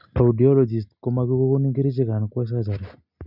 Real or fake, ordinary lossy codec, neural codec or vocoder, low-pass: real; none; none; 5.4 kHz